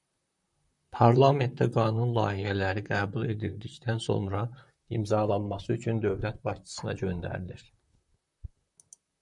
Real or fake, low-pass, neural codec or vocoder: fake; 10.8 kHz; vocoder, 44.1 kHz, 128 mel bands, Pupu-Vocoder